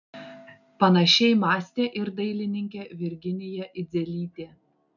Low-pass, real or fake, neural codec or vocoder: 7.2 kHz; real; none